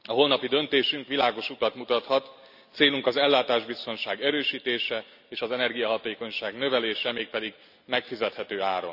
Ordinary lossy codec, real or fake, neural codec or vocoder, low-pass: none; real; none; 5.4 kHz